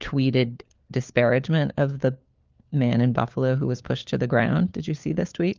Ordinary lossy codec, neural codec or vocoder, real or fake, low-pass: Opus, 32 kbps; codec, 16 kHz, 4 kbps, FunCodec, trained on LibriTTS, 50 frames a second; fake; 7.2 kHz